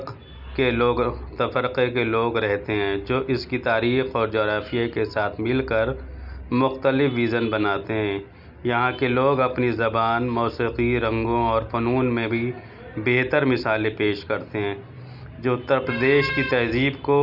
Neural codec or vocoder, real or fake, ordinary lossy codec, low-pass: none; real; none; 5.4 kHz